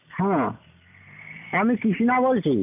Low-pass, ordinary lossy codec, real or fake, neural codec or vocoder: 3.6 kHz; none; real; none